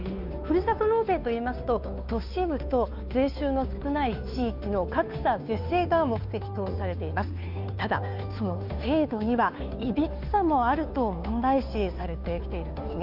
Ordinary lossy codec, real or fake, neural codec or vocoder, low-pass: none; fake; codec, 16 kHz, 2 kbps, FunCodec, trained on Chinese and English, 25 frames a second; 5.4 kHz